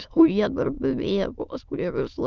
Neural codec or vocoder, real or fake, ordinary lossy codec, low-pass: autoencoder, 22.05 kHz, a latent of 192 numbers a frame, VITS, trained on many speakers; fake; Opus, 24 kbps; 7.2 kHz